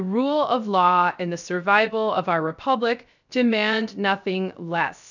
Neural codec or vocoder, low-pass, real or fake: codec, 16 kHz, 0.3 kbps, FocalCodec; 7.2 kHz; fake